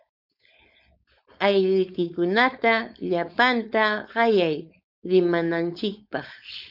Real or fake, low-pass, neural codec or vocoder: fake; 5.4 kHz; codec, 16 kHz, 4.8 kbps, FACodec